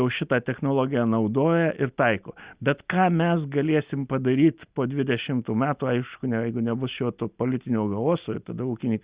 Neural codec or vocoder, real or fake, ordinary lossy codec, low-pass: none; real; Opus, 64 kbps; 3.6 kHz